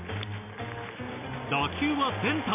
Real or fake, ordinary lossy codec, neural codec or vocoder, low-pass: real; MP3, 32 kbps; none; 3.6 kHz